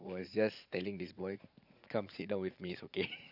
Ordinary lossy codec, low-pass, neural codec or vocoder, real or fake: none; 5.4 kHz; vocoder, 44.1 kHz, 80 mel bands, Vocos; fake